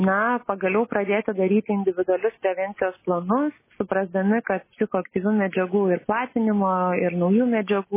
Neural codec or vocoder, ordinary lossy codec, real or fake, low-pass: none; MP3, 16 kbps; real; 3.6 kHz